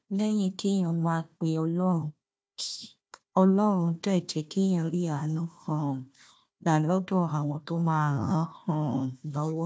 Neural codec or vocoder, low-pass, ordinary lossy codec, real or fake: codec, 16 kHz, 1 kbps, FunCodec, trained on Chinese and English, 50 frames a second; none; none; fake